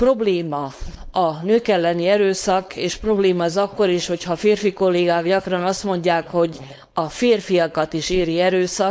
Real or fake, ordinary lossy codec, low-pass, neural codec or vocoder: fake; none; none; codec, 16 kHz, 4.8 kbps, FACodec